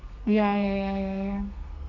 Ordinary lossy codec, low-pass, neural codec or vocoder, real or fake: none; 7.2 kHz; codec, 32 kHz, 1.9 kbps, SNAC; fake